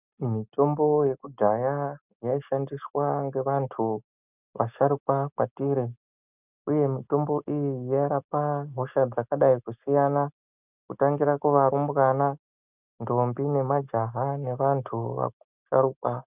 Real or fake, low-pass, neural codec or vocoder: real; 3.6 kHz; none